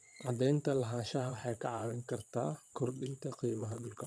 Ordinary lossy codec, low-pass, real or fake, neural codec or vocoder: none; none; fake; vocoder, 22.05 kHz, 80 mel bands, Vocos